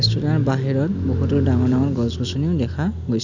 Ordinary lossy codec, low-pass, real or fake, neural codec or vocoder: none; 7.2 kHz; real; none